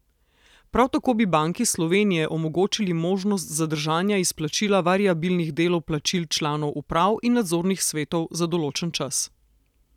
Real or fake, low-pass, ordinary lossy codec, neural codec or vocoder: real; 19.8 kHz; none; none